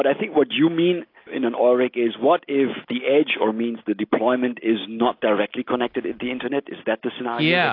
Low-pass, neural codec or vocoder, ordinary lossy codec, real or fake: 5.4 kHz; none; AAC, 32 kbps; real